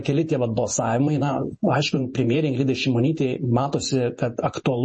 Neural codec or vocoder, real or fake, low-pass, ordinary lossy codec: vocoder, 48 kHz, 128 mel bands, Vocos; fake; 10.8 kHz; MP3, 32 kbps